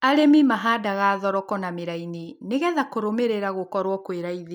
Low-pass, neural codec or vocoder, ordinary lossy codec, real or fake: 19.8 kHz; none; none; real